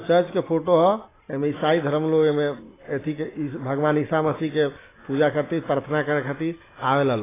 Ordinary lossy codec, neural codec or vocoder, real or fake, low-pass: AAC, 16 kbps; none; real; 3.6 kHz